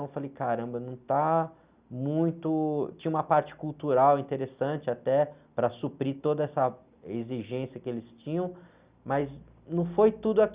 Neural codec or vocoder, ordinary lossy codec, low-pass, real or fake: none; Opus, 64 kbps; 3.6 kHz; real